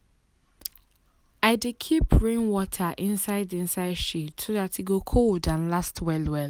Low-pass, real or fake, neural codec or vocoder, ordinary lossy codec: none; real; none; none